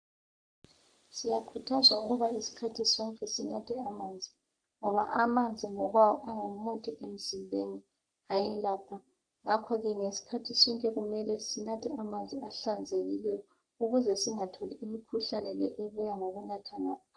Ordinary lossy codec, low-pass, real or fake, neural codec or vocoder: MP3, 96 kbps; 9.9 kHz; fake; codec, 44.1 kHz, 3.4 kbps, Pupu-Codec